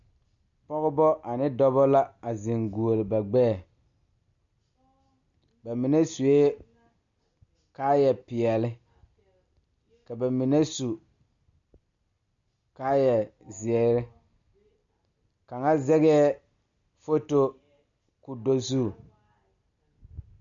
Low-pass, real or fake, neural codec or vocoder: 7.2 kHz; real; none